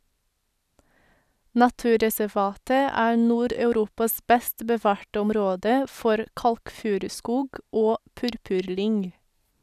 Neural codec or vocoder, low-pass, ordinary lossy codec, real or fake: none; 14.4 kHz; none; real